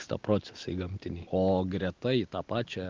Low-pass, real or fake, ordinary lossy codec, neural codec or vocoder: 7.2 kHz; real; Opus, 32 kbps; none